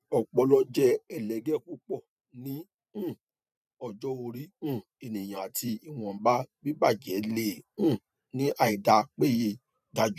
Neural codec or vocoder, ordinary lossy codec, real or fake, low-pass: vocoder, 44.1 kHz, 128 mel bands every 512 samples, BigVGAN v2; none; fake; 14.4 kHz